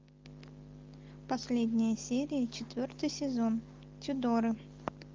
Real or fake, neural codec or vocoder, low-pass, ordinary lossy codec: real; none; 7.2 kHz; Opus, 32 kbps